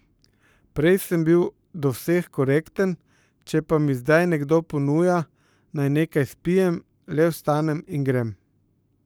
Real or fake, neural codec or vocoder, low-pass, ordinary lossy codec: fake; codec, 44.1 kHz, 7.8 kbps, Pupu-Codec; none; none